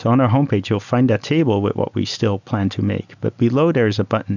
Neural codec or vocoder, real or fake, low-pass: none; real; 7.2 kHz